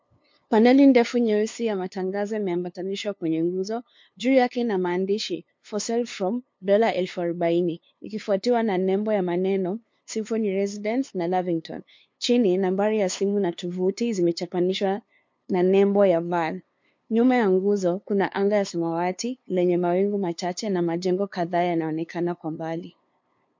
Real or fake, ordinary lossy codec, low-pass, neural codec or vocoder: fake; MP3, 48 kbps; 7.2 kHz; codec, 16 kHz, 2 kbps, FunCodec, trained on LibriTTS, 25 frames a second